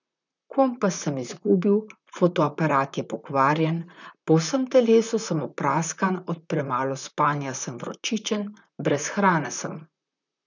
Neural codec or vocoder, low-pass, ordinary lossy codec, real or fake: vocoder, 44.1 kHz, 128 mel bands, Pupu-Vocoder; 7.2 kHz; none; fake